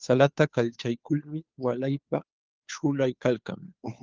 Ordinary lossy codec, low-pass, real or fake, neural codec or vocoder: Opus, 32 kbps; 7.2 kHz; fake; codec, 16 kHz, 2 kbps, FunCodec, trained on Chinese and English, 25 frames a second